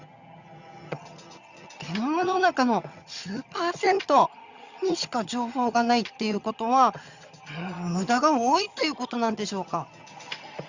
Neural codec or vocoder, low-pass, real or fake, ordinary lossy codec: vocoder, 22.05 kHz, 80 mel bands, HiFi-GAN; 7.2 kHz; fake; Opus, 64 kbps